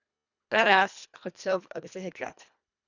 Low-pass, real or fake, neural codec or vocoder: 7.2 kHz; fake; codec, 24 kHz, 1.5 kbps, HILCodec